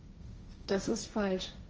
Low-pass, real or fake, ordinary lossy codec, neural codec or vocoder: 7.2 kHz; fake; Opus, 24 kbps; codec, 16 kHz, 1.1 kbps, Voila-Tokenizer